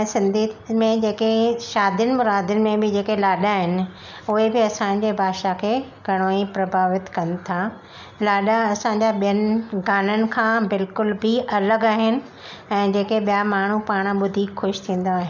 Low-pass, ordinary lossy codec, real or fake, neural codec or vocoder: 7.2 kHz; none; real; none